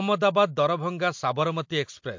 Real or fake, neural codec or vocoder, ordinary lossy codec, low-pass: real; none; MP3, 48 kbps; 7.2 kHz